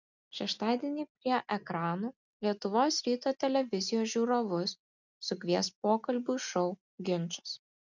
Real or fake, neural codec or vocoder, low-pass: real; none; 7.2 kHz